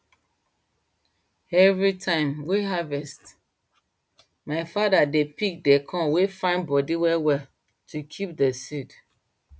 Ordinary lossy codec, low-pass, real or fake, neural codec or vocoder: none; none; real; none